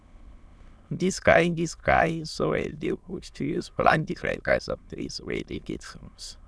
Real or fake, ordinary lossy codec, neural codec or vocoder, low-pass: fake; none; autoencoder, 22.05 kHz, a latent of 192 numbers a frame, VITS, trained on many speakers; none